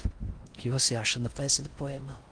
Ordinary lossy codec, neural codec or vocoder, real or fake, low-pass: Opus, 24 kbps; codec, 16 kHz in and 24 kHz out, 0.6 kbps, FocalCodec, streaming, 4096 codes; fake; 9.9 kHz